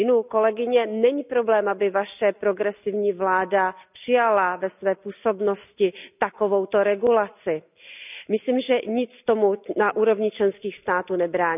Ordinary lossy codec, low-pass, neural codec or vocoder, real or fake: none; 3.6 kHz; none; real